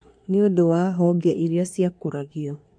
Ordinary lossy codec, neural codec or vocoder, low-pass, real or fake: MP3, 64 kbps; codec, 24 kHz, 1 kbps, SNAC; 9.9 kHz; fake